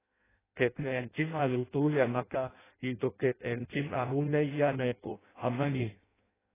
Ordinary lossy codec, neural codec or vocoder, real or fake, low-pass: AAC, 16 kbps; codec, 16 kHz in and 24 kHz out, 0.6 kbps, FireRedTTS-2 codec; fake; 3.6 kHz